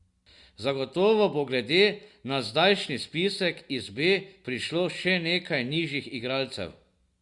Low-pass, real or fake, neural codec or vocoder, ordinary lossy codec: 10.8 kHz; real; none; Opus, 64 kbps